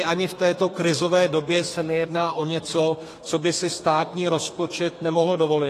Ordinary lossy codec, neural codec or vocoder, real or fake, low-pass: AAC, 48 kbps; codec, 32 kHz, 1.9 kbps, SNAC; fake; 14.4 kHz